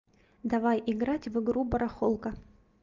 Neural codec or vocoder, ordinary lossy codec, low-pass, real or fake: none; Opus, 32 kbps; 7.2 kHz; real